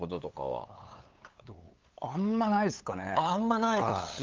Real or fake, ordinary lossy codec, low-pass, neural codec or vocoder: fake; Opus, 32 kbps; 7.2 kHz; codec, 16 kHz, 8 kbps, FunCodec, trained on LibriTTS, 25 frames a second